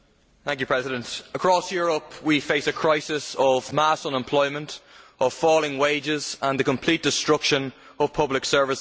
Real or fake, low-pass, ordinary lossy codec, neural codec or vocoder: real; none; none; none